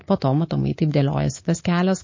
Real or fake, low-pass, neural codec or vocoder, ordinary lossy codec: fake; 7.2 kHz; codec, 24 kHz, 3.1 kbps, DualCodec; MP3, 32 kbps